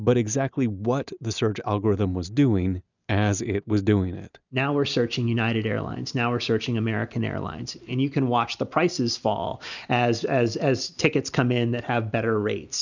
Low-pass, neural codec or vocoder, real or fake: 7.2 kHz; none; real